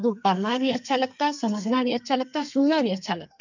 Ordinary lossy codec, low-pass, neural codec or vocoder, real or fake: none; 7.2 kHz; codec, 32 kHz, 1.9 kbps, SNAC; fake